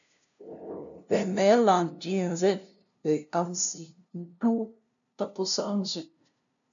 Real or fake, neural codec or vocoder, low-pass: fake; codec, 16 kHz, 0.5 kbps, FunCodec, trained on LibriTTS, 25 frames a second; 7.2 kHz